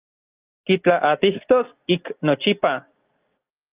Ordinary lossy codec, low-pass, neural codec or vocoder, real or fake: Opus, 24 kbps; 3.6 kHz; none; real